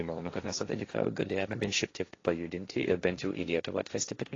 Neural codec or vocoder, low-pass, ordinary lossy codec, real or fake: codec, 16 kHz, 1.1 kbps, Voila-Tokenizer; 7.2 kHz; AAC, 32 kbps; fake